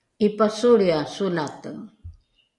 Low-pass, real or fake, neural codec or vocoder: 10.8 kHz; real; none